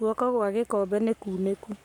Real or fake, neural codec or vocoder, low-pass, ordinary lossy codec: fake; codec, 44.1 kHz, 7.8 kbps, Pupu-Codec; 19.8 kHz; none